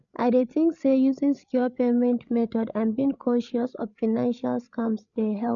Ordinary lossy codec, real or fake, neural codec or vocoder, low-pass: none; fake; codec, 16 kHz, 8 kbps, FreqCodec, larger model; 7.2 kHz